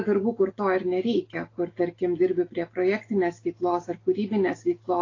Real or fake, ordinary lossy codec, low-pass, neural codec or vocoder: real; AAC, 32 kbps; 7.2 kHz; none